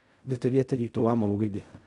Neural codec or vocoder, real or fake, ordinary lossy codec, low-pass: codec, 16 kHz in and 24 kHz out, 0.4 kbps, LongCat-Audio-Codec, fine tuned four codebook decoder; fake; none; 10.8 kHz